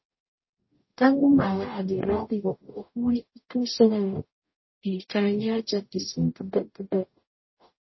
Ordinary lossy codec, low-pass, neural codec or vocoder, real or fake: MP3, 24 kbps; 7.2 kHz; codec, 44.1 kHz, 0.9 kbps, DAC; fake